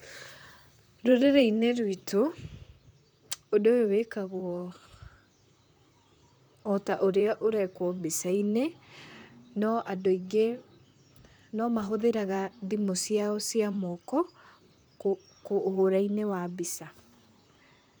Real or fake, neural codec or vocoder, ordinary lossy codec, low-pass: fake; vocoder, 44.1 kHz, 128 mel bands, Pupu-Vocoder; none; none